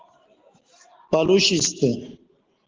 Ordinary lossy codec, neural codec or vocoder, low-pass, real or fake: Opus, 16 kbps; none; 7.2 kHz; real